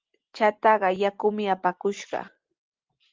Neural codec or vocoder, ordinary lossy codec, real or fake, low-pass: none; Opus, 32 kbps; real; 7.2 kHz